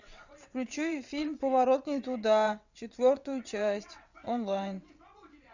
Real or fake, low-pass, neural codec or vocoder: fake; 7.2 kHz; vocoder, 22.05 kHz, 80 mel bands, WaveNeXt